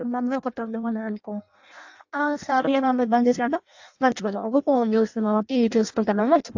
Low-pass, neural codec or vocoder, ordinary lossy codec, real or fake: 7.2 kHz; codec, 16 kHz in and 24 kHz out, 0.6 kbps, FireRedTTS-2 codec; AAC, 48 kbps; fake